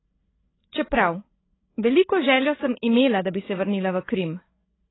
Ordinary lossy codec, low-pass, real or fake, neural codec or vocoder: AAC, 16 kbps; 7.2 kHz; fake; codec, 16 kHz, 16 kbps, FreqCodec, larger model